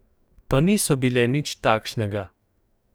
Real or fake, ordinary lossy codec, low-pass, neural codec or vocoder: fake; none; none; codec, 44.1 kHz, 2.6 kbps, DAC